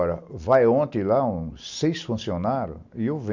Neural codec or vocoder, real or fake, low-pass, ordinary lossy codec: none; real; 7.2 kHz; Opus, 64 kbps